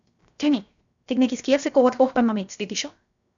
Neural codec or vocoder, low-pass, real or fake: codec, 16 kHz, 0.7 kbps, FocalCodec; 7.2 kHz; fake